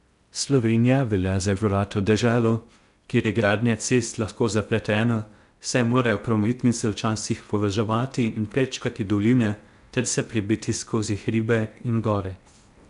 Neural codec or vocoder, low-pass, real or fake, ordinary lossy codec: codec, 16 kHz in and 24 kHz out, 0.6 kbps, FocalCodec, streaming, 4096 codes; 10.8 kHz; fake; none